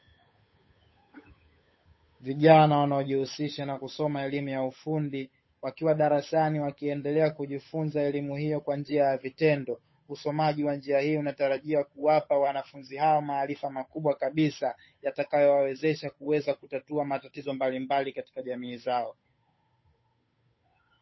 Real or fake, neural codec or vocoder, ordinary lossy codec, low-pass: fake; codec, 16 kHz, 8 kbps, FunCodec, trained on Chinese and English, 25 frames a second; MP3, 24 kbps; 7.2 kHz